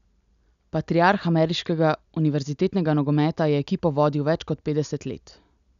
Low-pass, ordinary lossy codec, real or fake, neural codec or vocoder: 7.2 kHz; none; real; none